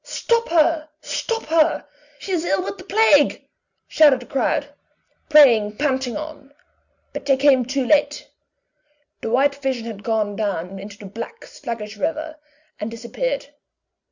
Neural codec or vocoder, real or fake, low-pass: none; real; 7.2 kHz